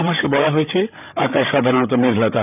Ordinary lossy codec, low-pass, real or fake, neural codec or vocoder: none; 3.6 kHz; fake; codec, 16 kHz, 8 kbps, FreqCodec, larger model